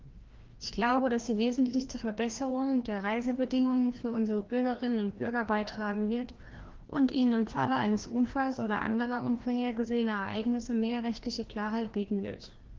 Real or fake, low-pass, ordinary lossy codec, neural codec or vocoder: fake; 7.2 kHz; Opus, 16 kbps; codec, 16 kHz, 1 kbps, FreqCodec, larger model